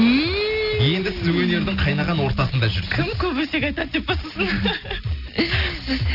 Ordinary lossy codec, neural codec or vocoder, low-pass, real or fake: none; none; 5.4 kHz; real